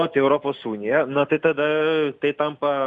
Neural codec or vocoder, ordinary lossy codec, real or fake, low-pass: none; Opus, 64 kbps; real; 10.8 kHz